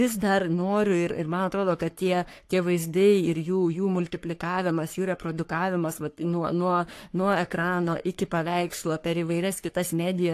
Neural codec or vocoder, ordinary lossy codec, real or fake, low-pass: codec, 44.1 kHz, 3.4 kbps, Pupu-Codec; AAC, 64 kbps; fake; 14.4 kHz